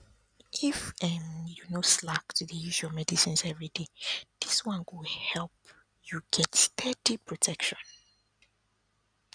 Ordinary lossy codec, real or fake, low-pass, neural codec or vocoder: none; real; 9.9 kHz; none